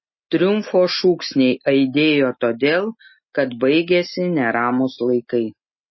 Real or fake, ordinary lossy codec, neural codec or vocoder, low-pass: real; MP3, 24 kbps; none; 7.2 kHz